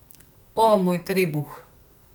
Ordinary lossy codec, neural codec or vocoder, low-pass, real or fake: none; codec, 44.1 kHz, 2.6 kbps, SNAC; none; fake